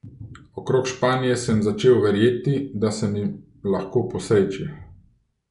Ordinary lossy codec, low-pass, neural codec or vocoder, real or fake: none; 10.8 kHz; none; real